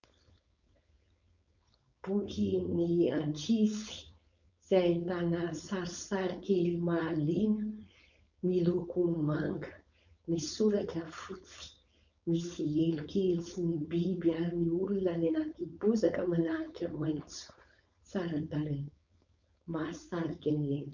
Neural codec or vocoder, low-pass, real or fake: codec, 16 kHz, 4.8 kbps, FACodec; 7.2 kHz; fake